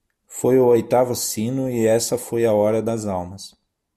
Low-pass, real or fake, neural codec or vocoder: 14.4 kHz; real; none